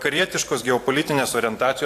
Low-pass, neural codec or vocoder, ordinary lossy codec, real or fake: 14.4 kHz; vocoder, 44.1 kHz, 128 mel bands every 512 samples, BigVGAN v2; AAC, 64 kbps; fake